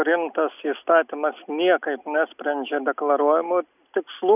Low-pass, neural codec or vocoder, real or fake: 3.6 kHz; none; real